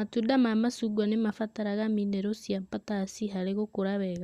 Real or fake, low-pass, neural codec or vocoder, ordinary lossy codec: real; 14.4 kHz; none; Opus, 64 kbps